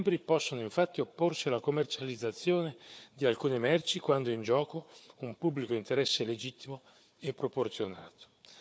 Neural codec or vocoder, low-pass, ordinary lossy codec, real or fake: codec, 16 kHz, 4 kbps, FunCodec, trained on Chinese and English, 50 frames a second; none; none; fake